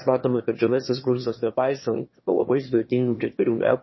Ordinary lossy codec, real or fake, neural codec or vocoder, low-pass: MP3, 24 kbps; fake; autoencoder, 22.05 kHz, a latent of 192 numbers a frame, VITS, trained on one speaker; 7.2 kHz